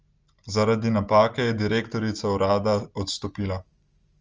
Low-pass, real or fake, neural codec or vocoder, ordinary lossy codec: 7.2 kHz; real; none; Opus, 24 kbps